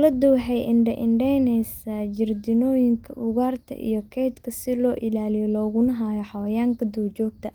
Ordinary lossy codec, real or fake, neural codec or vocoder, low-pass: Opus, 24 kbps; real; none; 19.8 kHz